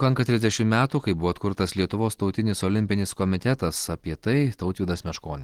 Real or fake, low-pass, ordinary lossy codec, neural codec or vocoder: real; 19.8 kHz; Opus, 16 kbps; none